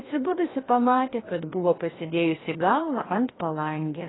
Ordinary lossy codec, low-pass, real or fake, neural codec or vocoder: AAC, 16 kbps; 7.2 kHz; fake; codec, 16 kHz, 1 kbps, FreqCodec, larger model